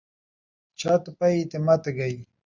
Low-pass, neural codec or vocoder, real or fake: 7.2 kHz; none; real